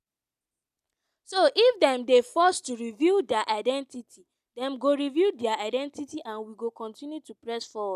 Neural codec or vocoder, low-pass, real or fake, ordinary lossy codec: none; 14.4 kHz; real; none